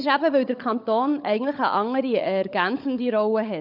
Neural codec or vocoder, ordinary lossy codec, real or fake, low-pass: codec, 16 kHz, 16 kbps, FunCodec, trained on LibriTTS, 50 frames a second; none; fake; 5.4 kHz